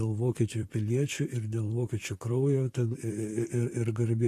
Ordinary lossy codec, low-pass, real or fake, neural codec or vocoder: AAC, 48 kbps; 14.4 kHz; fake; vocoder, 44.1 kHz, 128 mel bands, Pupu-Vocoder